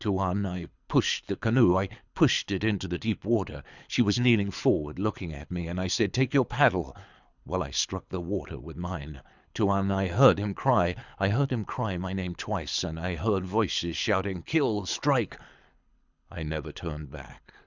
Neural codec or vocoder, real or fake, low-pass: codec, 24 kHz, 6 kbps, HILCodec; fake; 7.2 kHz